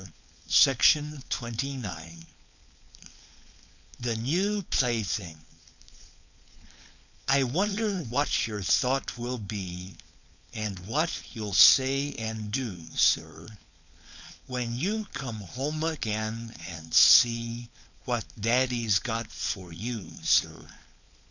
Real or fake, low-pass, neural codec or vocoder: fake; 7.2 kHz; codec, 16 kHz, 4.8 kbps, FACodec